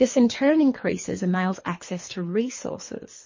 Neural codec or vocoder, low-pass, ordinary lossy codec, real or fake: codec, 24 kHz, 3 kbps, HILCodec; 7.2 kHz; MP3, 32 kbps; fake